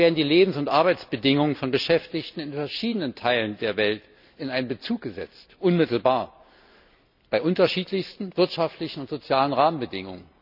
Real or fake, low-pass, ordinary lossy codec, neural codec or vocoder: real; 5.4 kHz; none; none